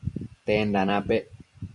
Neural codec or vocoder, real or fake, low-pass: vocoder, 44.1 kHz, 128 mel bands every 256 samples, BigVGAN v2; fake; 10.8 kHz